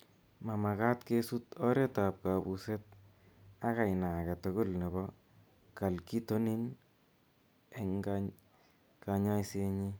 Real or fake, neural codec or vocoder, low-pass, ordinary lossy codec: real; none; none; none